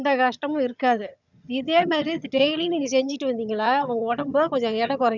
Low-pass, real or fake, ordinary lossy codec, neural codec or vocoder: 7.2 kHz; fake; none; vocoder, 22.05 kHz, 80 mel bands, HiFi-GAN